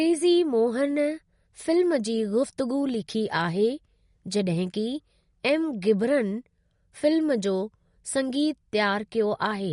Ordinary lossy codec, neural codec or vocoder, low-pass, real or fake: MP3, 48 kbps; none; 10.8 kHz; real